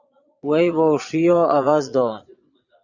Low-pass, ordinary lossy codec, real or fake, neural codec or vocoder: 7.2 kHz; Opus, 64 kbps; fake; vocoder, 22.05 kHz, 80 mel bands, Vocos